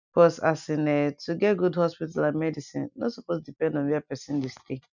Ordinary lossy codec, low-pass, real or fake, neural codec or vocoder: none; 7.2 kHz; real; none